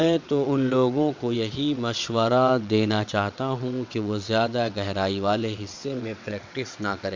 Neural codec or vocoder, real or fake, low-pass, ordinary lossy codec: vocoder, 22.05 kHz, 80 mel bands, WaveNeXt; fake; 7.2 kHz; MP3, 64 kbps